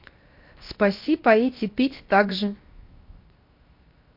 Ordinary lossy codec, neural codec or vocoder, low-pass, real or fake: MP3, 32 kbps; codec, 16 kHz, 0.7 kbps, FocalCodec; 5.4 kHz; fake